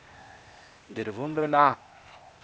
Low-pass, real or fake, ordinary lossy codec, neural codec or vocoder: none; fake; none; codec, 16 kHz, 0.8 kbps, ZipCodec